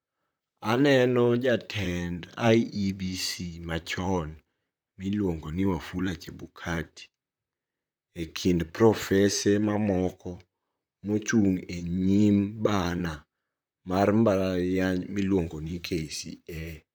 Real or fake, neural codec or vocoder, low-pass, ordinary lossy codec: fake; vocoder, 44.1 kHz, 128 mel bands, Pupu-Vocoder; none; none